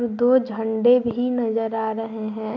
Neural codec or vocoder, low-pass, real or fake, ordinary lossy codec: none; 7.2 kHz; real; none